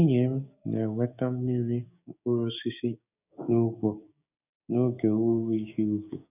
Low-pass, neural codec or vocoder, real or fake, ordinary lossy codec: 3.6 kHz; codec, 16 kHz, 6 kbps, DAC; fake; none